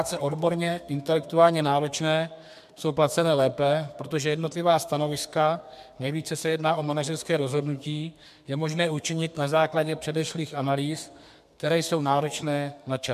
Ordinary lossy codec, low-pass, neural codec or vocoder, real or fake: MP3, 96 kbps; 14.4 kHz; codec, 32 kHz, 1.9 kbps, SNAC; fake